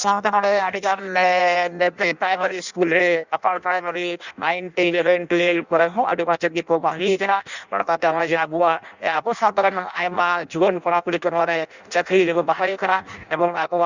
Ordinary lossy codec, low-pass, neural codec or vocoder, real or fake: Opus, 64 kbps; 7.2 kHz; codec, 16 kHz in and 24 kHz out, 0.6 kbps, FireRedTTS-2 codec; fake